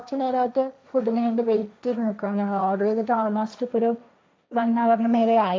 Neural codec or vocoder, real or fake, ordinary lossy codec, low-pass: codec, 16 kHz, 1.1 kbps, Voila-Tokenizer; fake; none; none